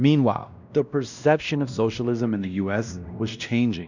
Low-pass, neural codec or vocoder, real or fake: 7.2 kHz; codec, 16 kHz, 1 kbps, X-Codec, WavLM features, trained on Multilingual LibriSpeech; fake